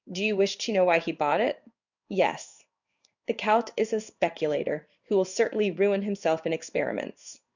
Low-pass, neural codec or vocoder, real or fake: 7.2 kHz; codec, 16 kHz in and 24 kHz out, 1 kbps, XY-Tokenizer; fake